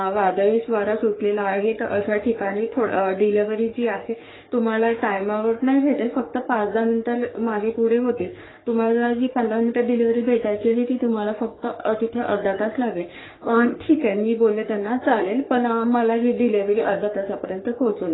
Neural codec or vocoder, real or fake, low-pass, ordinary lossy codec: codec, 44.1 kHz, 3.4 kbps, Pupu-Codec; fake; 7.2 kHz; AAC, 16 kbps